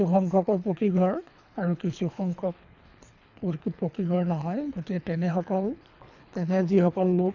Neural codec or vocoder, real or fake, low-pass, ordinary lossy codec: codec, 24 kHz, 3 kbps, HILCodec; fake; 7.2 kHz; none